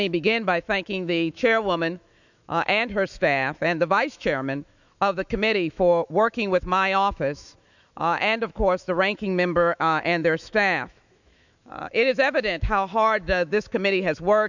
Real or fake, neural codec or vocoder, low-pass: fake; autoencoder, 48 kHz, 128 numbers a frame, DAC-VAE, trained on Japanese speech; 7.2 kHz